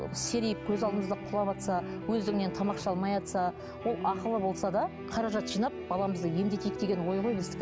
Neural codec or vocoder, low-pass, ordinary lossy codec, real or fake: none; none; none; real